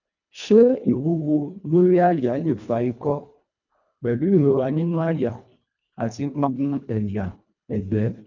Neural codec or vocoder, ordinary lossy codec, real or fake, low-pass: codec, 24 kHz, 1.5 kbps, HILCodec; none; fake; 7.2 kHz